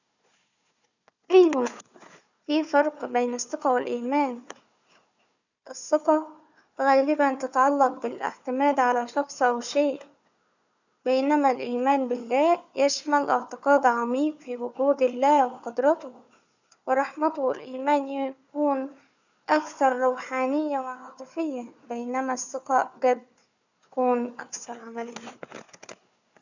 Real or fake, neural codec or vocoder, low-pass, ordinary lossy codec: fake; codec, 16 kHz, 4 kbps, FunCodec, trained on Chinese and English, 50 frames a second; 7.2 kHz; none